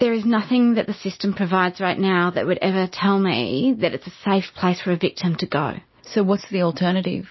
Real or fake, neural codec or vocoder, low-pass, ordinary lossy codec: real; none; 7.2 kHz; MP3, 24 kbps